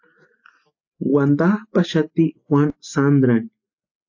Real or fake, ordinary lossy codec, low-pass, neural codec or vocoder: real; AAC, 48 kbps; 7.2 kHz; none